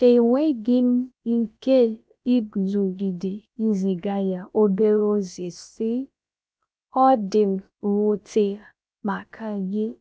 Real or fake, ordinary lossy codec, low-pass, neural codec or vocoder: fake; none; none; codec, 16 kHz, about 1 kbps, DyCAST, with the encoder's durations